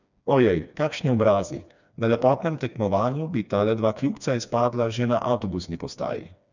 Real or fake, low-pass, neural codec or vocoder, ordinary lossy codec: fake; 7.2 kHz; codec, 16 kHz, 2 kbps, FreqCodec, smaller model; none